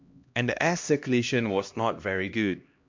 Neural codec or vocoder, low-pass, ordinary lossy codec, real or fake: codec, 16 kHz, 1 kbps, X-Codec, HuBERT features, trained on LibriSpeech; 7.2 kHz; MP3, 64 kbps; fake